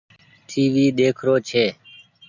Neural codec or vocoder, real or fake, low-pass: none; real; 7.2 kHz